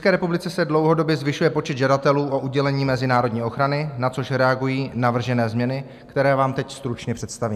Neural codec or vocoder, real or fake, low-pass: none; real; 14.4 kHz